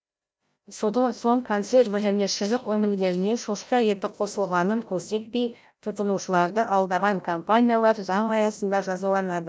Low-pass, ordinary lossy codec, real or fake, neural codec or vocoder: none; none; fake; codec, 16 kHz, 0.5 kbps, FreqCodec, larger model